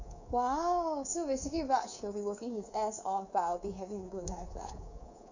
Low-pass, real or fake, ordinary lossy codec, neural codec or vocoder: 7.2 kHz; fake; none; codec, 24 kHz, 3.1 kbps, DualCodec